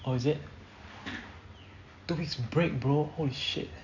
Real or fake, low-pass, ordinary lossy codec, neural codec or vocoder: real; 7.2 kHz; none; none